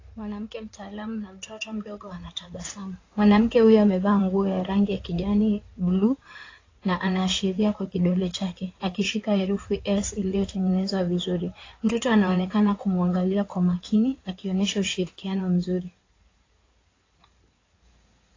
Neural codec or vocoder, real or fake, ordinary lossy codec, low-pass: codec, 16 kHz in and 24 kHz out, 2.2 kbps, FireRedTTS-2 codec; fake; AAC, 32 kbps; 7.2 kHz